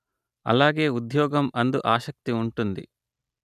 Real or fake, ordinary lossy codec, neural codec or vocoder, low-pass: real; none; none; 14.4 kHz